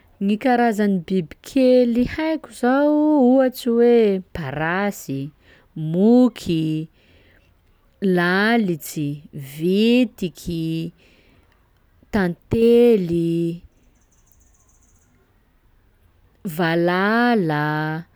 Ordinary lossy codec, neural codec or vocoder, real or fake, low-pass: none; none; real; none